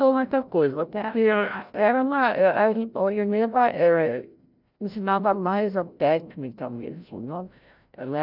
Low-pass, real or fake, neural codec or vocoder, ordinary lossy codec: 5.4 kHz; fake; codec, 16 kHz, 0.5 kbps, FreqCodec, larger model; none